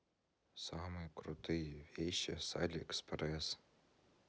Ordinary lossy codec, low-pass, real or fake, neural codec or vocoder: none; none; real; none